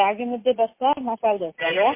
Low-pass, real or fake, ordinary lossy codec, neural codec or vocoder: 3.6 kHz; real; MP3, 24 kbps; none